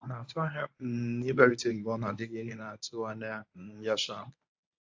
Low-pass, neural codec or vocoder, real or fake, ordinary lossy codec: 7.2 kHz; codec, 24 kHz, 0.9 kbps, WavTokenizer, medium speech release version 1; fake; AAC, 48 kbps